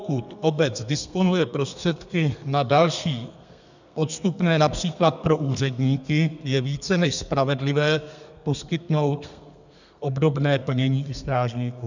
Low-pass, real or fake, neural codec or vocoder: 7.2 kHz; fake; codec, 32 kHz, 1.9 kbps, SNAC